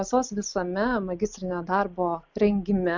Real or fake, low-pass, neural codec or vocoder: real; 7.2 kHz; none